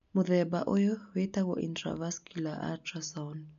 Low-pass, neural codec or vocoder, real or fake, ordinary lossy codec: 7.2 kHz; none; real; AAC, 64 kbps